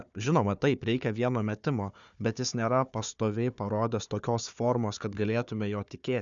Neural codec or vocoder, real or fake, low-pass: codec, 16 kHz, 4 kbps, FunCodec, trained on Chinese and English, 50 frames a second; fake; 7.2 kHz